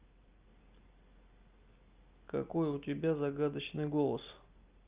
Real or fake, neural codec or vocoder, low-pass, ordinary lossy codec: real; none; 3.6 kHz; Opus, 32 kbps